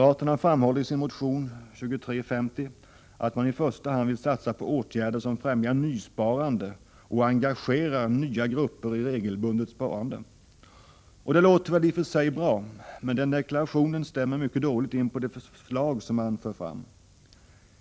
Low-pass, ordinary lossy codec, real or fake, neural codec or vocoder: none; none; real; none